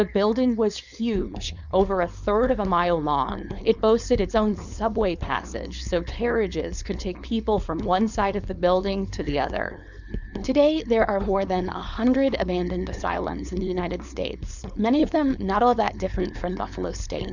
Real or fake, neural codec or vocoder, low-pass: fake; codec, 16 kHz, 4.8 kbps, FACodec; 7.2 kHz